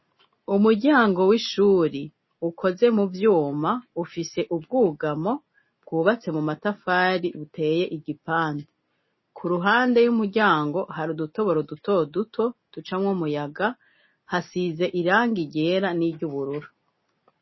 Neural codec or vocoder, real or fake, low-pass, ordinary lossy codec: none; real; 7.2 kHz; MP3, 24 kbps